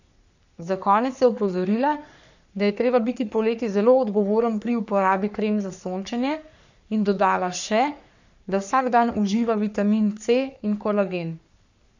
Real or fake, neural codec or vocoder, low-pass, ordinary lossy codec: fake; codec, 44.1 kHz, 3.4 kbps, Pupu-Codec; 7.2 kHz; none